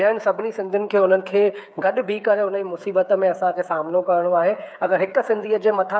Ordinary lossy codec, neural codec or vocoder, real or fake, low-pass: none; codec, 16 kHz, 8 kbps, FreqCodec, smaller model; fake; none